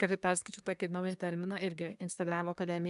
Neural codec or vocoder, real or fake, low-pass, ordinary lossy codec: codec, 24 kHz, 1 kbps, SNAC; fake; 10.8 kHz; AAC, 96 kbps